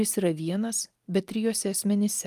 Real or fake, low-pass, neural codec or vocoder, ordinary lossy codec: real; 14.4 kHz; none; Opus, 32 kbps